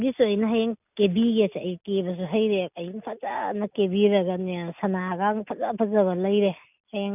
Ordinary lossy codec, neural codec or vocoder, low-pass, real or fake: none; none; 3.6 kHz; real